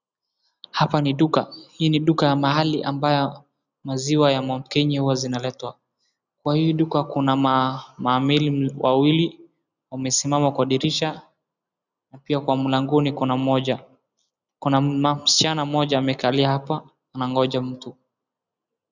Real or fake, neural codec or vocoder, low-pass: real; none; 7.2 kHz